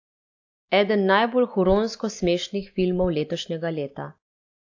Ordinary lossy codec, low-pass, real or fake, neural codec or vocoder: AAC, 48 kbps; 7.2 kHz; real; none